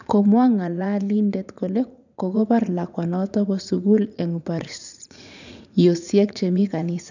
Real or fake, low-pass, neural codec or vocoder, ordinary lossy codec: fake; 7.2 kHz; vocoder, 44.1 kHz, 80 mel bands, Vocos; none